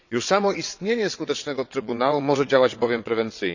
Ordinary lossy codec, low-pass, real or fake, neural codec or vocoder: none; 7.2 kHz; fake; vocoder, 22.05 kHz, 80 mel bands, WaveNeXt